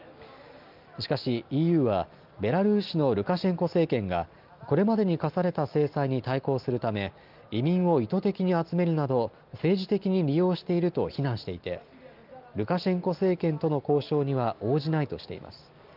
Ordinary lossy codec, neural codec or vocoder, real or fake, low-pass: Opus, 32 kbps; none; real; 5.4 kHz